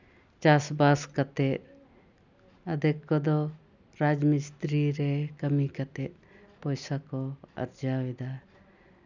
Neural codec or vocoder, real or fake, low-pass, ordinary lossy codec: none; real; 7.2 kHz; none